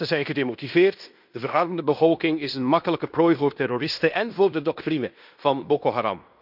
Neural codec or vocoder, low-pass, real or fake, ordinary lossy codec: codec, 16 kHz in and 24 kHz out, 0.9 kbps, LongCat-Audio-Codec, fine tuned four codebook decoder; 5.4 kHz; fake; none